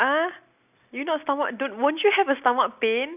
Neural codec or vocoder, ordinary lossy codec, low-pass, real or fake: none; none; 3.6 kHz; real